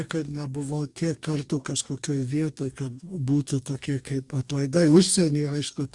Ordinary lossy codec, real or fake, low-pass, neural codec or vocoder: Opus, 64 kbps; fake; 10.8 kHz; codec, 44.1 kHz, 2.6 kbps, DAC